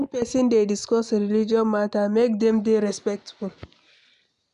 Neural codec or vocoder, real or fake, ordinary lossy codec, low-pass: none; real; none; 10.8 kHz